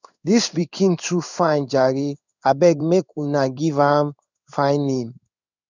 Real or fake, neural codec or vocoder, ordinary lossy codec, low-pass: fake; codec, 16 kHz in and 24 kHz out, 1 kbps, XY-Tokenizer; none; 7.2 kHz